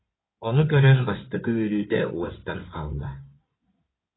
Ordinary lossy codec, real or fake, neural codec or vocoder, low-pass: AAC, 16 kbps; fake; codec, 16 kHz in and 24 kHz out, 2.2 kbps, FireRedTTS-2 codec; 7.2 kHz